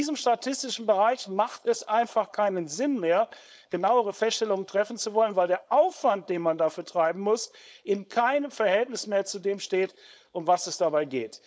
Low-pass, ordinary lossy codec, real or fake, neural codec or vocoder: none; none; fake; codec, 16 kHz, 4.8 kbps, FACodec